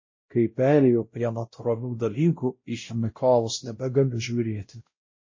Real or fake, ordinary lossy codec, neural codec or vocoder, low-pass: fake; MP3, 32 kbps; codec, 16 kHz, 0.5 kbps, X-Codec, WavLM features, trained on Multilingual LibriSpeech; 7.2 kHz